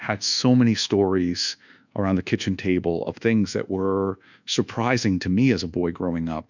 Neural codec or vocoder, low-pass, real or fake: codec, 24 kHz, 1.2 kbps, DualCodec; 7.2 kHz; fake